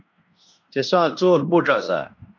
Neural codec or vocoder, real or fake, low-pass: codec, 16 kHz in and 24 kHz out, 0.9 kbps, LongCat-Audio-Codec, fine tuned four codebook decoder; fake; 7.2 kHz